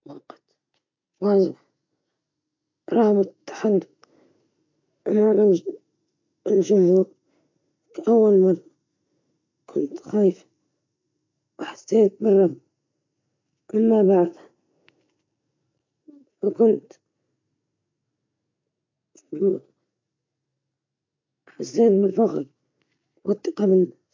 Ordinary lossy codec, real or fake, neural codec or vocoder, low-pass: MP3, 48 kbps; real; none; 7.2 kHz